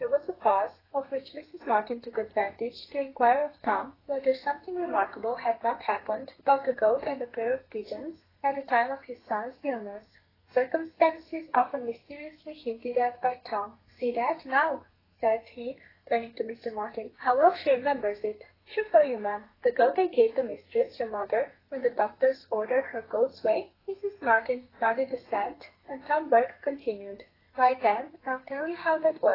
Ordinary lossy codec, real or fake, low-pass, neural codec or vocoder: AAC, 24 kbps; fake; 5.4 kHz; codec, 32 kHz, 1.9 kbps, SNAC